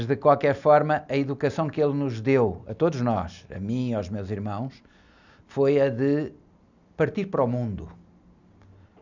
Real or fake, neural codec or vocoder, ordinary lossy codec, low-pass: real; none; none; 7.2 kHz